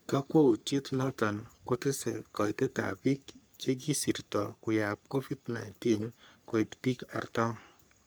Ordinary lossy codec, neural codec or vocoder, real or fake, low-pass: none; codec, 44.1 kHz, 3.4 kbps, Pupu-Codec; fake; none